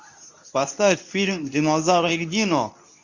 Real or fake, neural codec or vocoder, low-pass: fake; codec, 24 kHz, 0.9 kbps, WavTokenizer, medium speech release version 2; 7.2 kHz